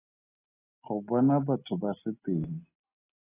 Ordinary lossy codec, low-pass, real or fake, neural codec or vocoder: AAC, 16 kbps; 3.6 kHz; real; none